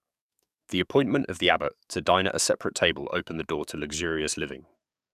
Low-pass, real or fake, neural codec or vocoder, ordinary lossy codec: 14.4 kHz; fake; codec, 44.1 kHz, 7.8 kbps, DAC; none